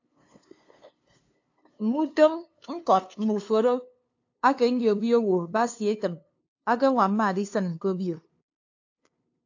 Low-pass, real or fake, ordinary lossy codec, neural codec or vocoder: 7.2 kHz; fake; AAC, 48 kbps; codec, 16 kHz, 2 kbps, FunCodec, trained on LibriTTS, 25 frames a second